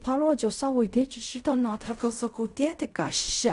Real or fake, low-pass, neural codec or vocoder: fake; 10.8 kHz; codec, 16 kHz in and 24 kHz out, 0.4 kbps, LongCat-Audio-Codec, fine tuned four codebook decoder